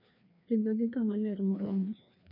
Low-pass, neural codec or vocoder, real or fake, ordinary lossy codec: 5.4 kHz; codec, 16 kHz, 2 kbps, FreqCodec, larger model; fake; none